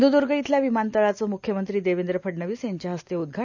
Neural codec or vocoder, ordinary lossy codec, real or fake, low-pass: none; none; real; 7.2 kHz